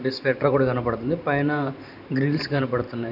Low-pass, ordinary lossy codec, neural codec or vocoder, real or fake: 5.4 kHz; none; vocoder, 44.1 kHz, 128 mel bands every 512 samples, BigVGAN v2; fake